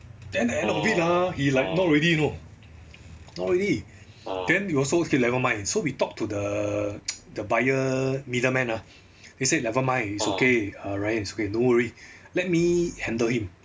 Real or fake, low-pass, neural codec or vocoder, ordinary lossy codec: real; none; none; none